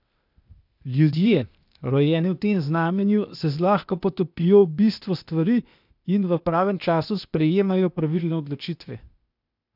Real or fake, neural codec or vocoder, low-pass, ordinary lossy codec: fake; codec, 16 kHz, 0.8 kbps, ZipCodec; 5.4 kHz; none